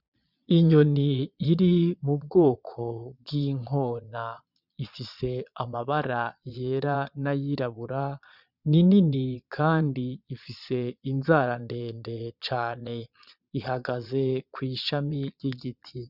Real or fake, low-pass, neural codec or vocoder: fake; 5.4 kHz; vocoder, 22.05 kHz, 80 mel bands, Vocos